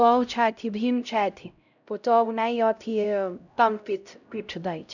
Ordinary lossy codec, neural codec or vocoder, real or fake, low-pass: none; codec, 16 kHz, 0.5 kbps, X-Codec, HuBERT features, trained on LibriSpeech; fake; 7.2 kHz